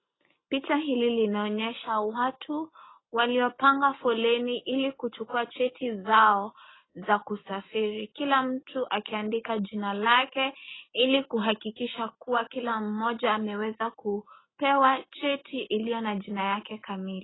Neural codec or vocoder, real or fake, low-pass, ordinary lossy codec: none; real; 7.2 kHz; AAC, 16 kbps